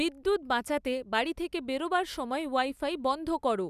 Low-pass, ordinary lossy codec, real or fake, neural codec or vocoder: 14.4 kHz; none; real; none